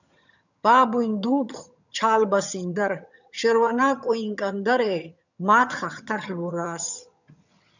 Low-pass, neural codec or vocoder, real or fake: 7.2 kHz; vocoder, 22.05 kHz, 80 mel bands, HiFi-GAN; fake